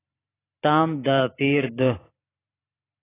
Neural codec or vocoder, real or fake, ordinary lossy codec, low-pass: vocoder, 44.1 kHz, 128 mel bands every 512 samples, BigVGAN v2; fake; AAC, 16 kbps; 3.6 kHz